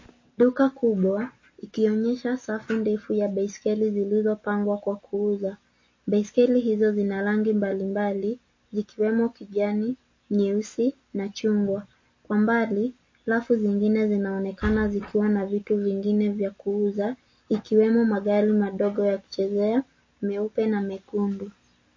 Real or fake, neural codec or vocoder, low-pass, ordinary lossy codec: real; none; 7.2 kHz; MP3, 32 kbps